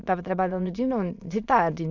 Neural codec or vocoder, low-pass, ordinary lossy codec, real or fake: autoencoder, 22.05 kHz, a latent of 192 numbers a frame, VITS, trained on many speakers; 7.2 kHz; none; fake